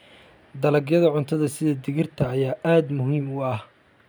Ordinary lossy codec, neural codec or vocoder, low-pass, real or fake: none; none; none; real